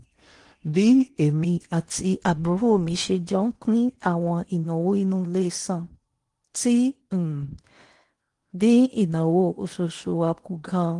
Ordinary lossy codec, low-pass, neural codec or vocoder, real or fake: Opus, 24 kbps; 10.8 kHz; codec, 16 kHz in and 24 kHz out, 0.8 kbps, FocalCodec, streaming, 65536 codes; fake